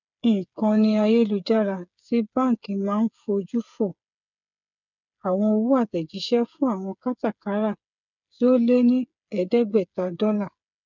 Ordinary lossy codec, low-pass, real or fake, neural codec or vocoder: none; 7.2 kHz; fake; codec, 16 kHz, 8 kbps, FreqCodec, smaller model